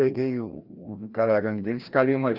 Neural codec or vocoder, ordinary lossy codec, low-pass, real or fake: codec, 16 kHz, 1 kbps, FreqCodec, larger model; Opus, 32 kbps; 5.4 kHz; fake